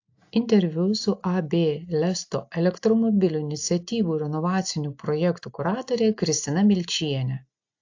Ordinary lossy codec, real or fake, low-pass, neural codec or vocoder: AAC, 48 kbps; real; 7.2 kHz; none